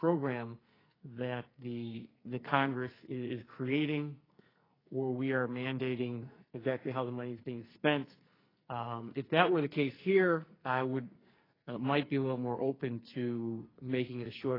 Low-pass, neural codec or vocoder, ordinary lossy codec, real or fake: 5.4 kHz; codec, 44.1 kHz, 2.6 kbps, SNAC; AAC, 24 kbps; fake